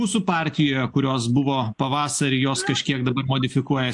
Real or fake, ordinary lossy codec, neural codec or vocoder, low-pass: real; AAC, 64 kbps; none; 10.8 kHz